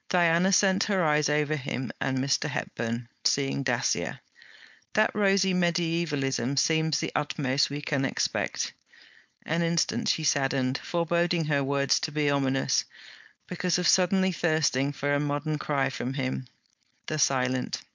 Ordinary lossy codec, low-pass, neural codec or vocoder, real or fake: MP3, 64 kbps; 7.2 kHz; codec, 16 kHz, 4.8 kbps, FACodec; fake